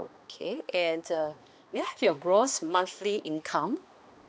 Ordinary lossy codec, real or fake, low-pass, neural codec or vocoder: none; fake; none; codec, 16 kHz, 2 kbps, X-Codec, HuBERT features, trained on balanced general audio